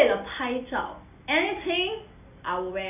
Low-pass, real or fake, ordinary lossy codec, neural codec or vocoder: 3.6 kHz; real; none; none